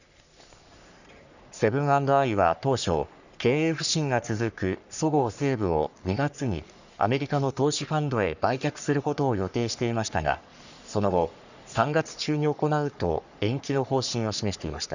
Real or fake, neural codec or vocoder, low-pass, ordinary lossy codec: fake; codec, 44.1 kHz, 3.4 kbps, Pupu-Codec; 7.2 kHz; none